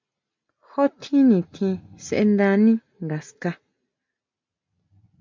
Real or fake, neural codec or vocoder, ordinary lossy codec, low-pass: real; none; MP3, 64 kbps; 7.2 kHz